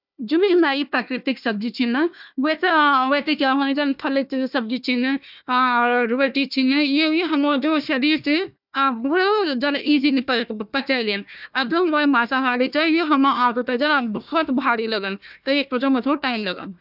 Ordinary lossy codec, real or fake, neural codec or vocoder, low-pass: none; fake; codec, 16 kHz, 1 kbps, FunCodec, trained on Chinese and English, 50 frames a second; 5.4 kHz